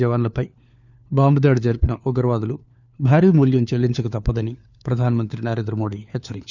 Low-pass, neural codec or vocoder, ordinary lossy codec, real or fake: 7.2 kHz; codec, 16 kHz, 4 kbps, FunCodec, trained on LibriTTS, 50 frames a second; none; fake